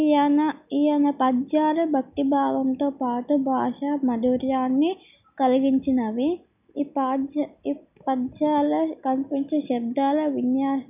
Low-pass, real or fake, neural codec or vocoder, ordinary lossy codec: 3.6 kHz; real; none; none